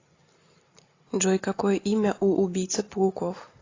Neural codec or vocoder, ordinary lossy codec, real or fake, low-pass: none; AAC, 32 kbps; real; 7.2 kHz